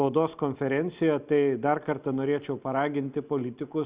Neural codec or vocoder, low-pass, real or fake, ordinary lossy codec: none; 3.6 kHz; real; Opus, 64 kbps